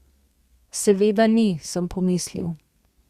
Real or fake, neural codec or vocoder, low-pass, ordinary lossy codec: fake; codec, 32 kHz, 1.9 kbps, SNAC; 14.4 kHz; Opus, 64 kbps